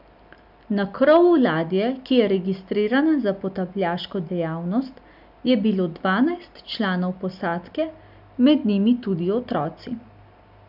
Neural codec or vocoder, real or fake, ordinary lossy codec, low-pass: none; real; none; 5.4 kHz